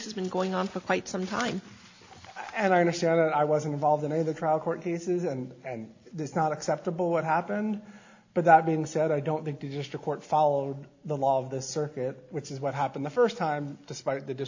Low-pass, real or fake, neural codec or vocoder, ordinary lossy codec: 7.2 kHz; real; none; AAC, 48 kbps